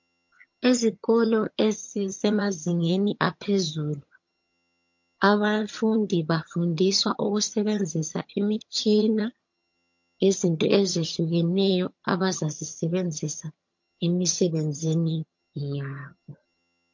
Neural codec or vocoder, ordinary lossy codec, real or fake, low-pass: vocoder, 22.05 kHz, 80 mel bands, HiFi-GAN; MP3, 48 kbps; fake; 7.2 kHz